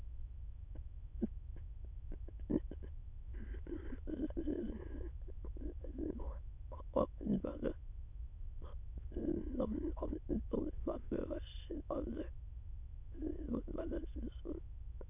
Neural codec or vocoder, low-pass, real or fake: autoencoder, 22.05 kHz, a latent of 192 numbers a frame, VITS, trained on many speakers; 3.6 kHz; fake